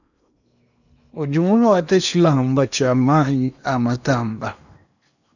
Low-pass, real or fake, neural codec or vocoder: 7.2 kHz; fake; codec, 16 kHz in and 24 kHz out, 0.8 kbps, FocalCodec, streaming, 65536 codes